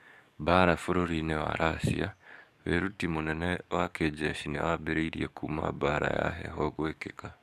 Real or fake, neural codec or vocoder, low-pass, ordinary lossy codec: fake; codec, 44.1 kHz, 7.8 kbps, DAC; 14.4 kHz; none